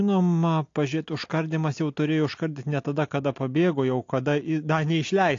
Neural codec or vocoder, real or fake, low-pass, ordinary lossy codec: none; real; 7.2 kHz; AAC, 48 kbps